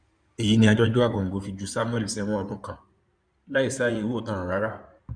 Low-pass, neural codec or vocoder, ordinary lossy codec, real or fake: 9.9 kHz; codec, 16 kHz in and 24 kHz out, 2.2 kbps, FireRedTTS-2 codec; none; fake